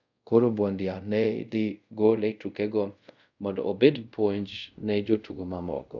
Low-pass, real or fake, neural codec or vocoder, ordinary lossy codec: 7.2 kHz; fake; codec, 24 kHz, 0.5 kbps, DualCodec; none